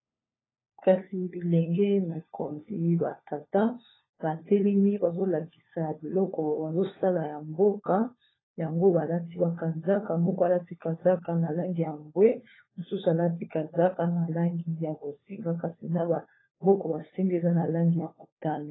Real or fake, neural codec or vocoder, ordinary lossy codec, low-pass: fake; codec, 16 kHz, 4 kbps, FunCodec, trained on LibriTTS, 50 frames a second; AAC, 16 kbps; 7.2 kHz